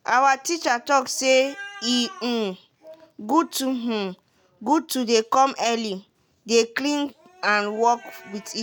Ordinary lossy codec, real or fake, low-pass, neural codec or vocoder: none; real; none; none